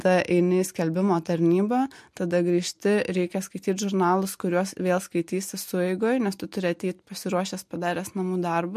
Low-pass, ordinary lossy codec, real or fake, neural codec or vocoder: 14.4 kHz; MP3, 64 kbps; real; none